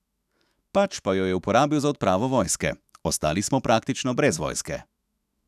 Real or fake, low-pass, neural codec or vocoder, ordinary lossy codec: fake; 14.4 kHz; autoencoder, 48 kHz, 128 numbers a frame, DAC-VAE, trained on Japanese speech; none